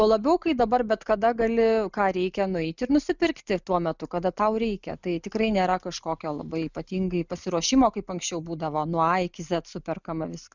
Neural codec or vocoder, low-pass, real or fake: none; 7.2 kHz; real